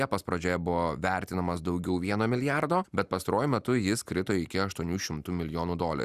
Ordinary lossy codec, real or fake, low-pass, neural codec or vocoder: Opus, 64 kbps; real; 14.4 kHz; none